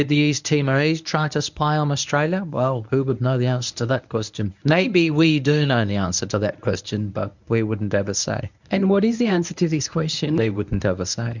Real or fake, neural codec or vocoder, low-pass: fake; codec, 24 kHz, 0.9 kbps, WavTokenizer, medium speech release version 1; 7.2 kHz